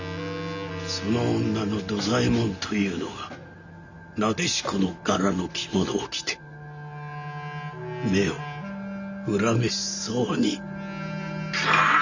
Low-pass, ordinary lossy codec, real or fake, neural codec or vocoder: 7.2 kHz; none; real; none